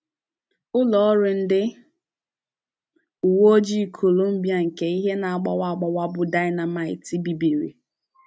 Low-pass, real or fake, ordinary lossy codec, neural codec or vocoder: none; real; none; none